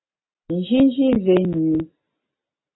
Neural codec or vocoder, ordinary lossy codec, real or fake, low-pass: none; AAC, 16 kbps; real; 7.2 kHz